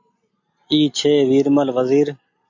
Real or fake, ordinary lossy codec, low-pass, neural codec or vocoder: real; AAC, 48 kbps; 7.2 kHz; none